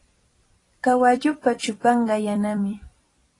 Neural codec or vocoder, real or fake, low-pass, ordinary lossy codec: vocoder, 44.1 kHz, 128 mel bands every 256 samples, BigVGAN v2; fake; 10.8 kHz; AAC, 32 kbps